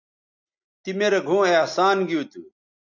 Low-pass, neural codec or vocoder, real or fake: 7.2 kHz; none; real